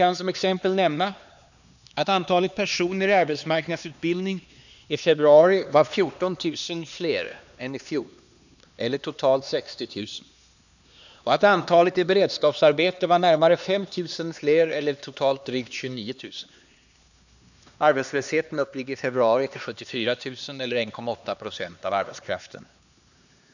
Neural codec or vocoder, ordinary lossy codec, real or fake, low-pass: codec, 16 kHz, 2 kbps, X-Codec, HuBERT features, trained on LibriSpeech; none; fake; 7.2 kHz